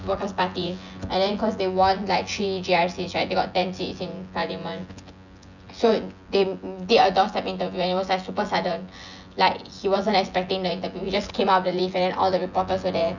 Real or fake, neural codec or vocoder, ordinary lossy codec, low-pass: fake; vocoder, 24 kHz, 100 mel bands, Vocos; none; 7.2 kHz